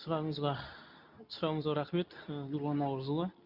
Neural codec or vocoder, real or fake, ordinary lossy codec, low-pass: codec, 24 kHz, 0.9 kbps, WavTokenizer, medium speech release version 2; fake; none; 5.4 kHz